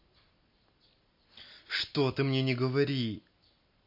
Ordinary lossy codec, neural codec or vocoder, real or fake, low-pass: MP3, 32 kbps; none; real; 5.4 kHz